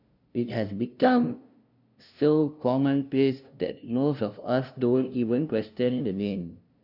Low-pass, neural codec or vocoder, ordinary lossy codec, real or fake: 5.4 kHz; codec, 16 kHz, 0.5 kbps, FunCodec, trained on LibriTTS, 25 frames a second; none; fake